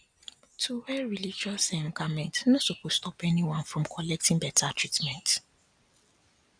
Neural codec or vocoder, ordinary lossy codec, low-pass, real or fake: none; none; 9.9 kHz; real